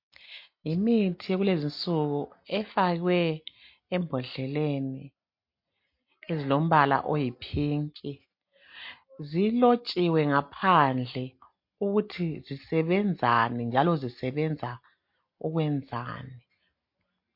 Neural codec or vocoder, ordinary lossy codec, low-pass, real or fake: none; MP3, 32 kbps; 5.4 kHz; real